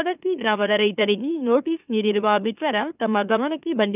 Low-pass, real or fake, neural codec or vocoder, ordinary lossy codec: 3.6 kHz; fake; autoencoder, 44.1 kHz, a latent of 192 numbers a frame, MeloTTS; none